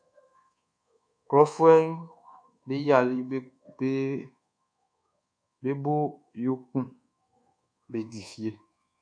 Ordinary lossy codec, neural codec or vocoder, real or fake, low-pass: AAC, 64 kbps; codec, 24 kHz, 1.2 kbps, DualCodec; fake; 9.9 kHz